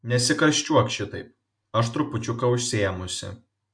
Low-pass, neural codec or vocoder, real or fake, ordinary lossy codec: 9.9 kHz; none; real; MP3, 48 kbps